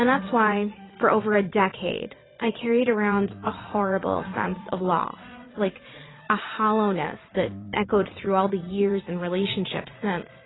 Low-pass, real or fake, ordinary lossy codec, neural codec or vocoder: 7.2 kHz; fake; AAC, 16 kbps; vocoder, 22.05 kHz, 80 mel bands, Vocos